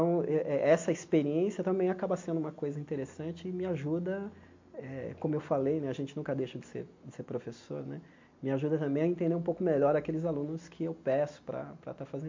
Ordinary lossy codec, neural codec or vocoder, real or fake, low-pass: MP3, 48 kbps; none; real; 7.2 kHz